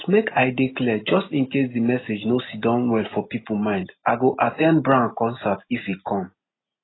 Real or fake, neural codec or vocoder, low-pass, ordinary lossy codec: real; none; 7.2 kHz; AAC, 16 kbps